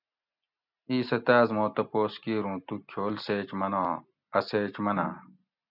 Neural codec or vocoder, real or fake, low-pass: none; real; 5.4 kHz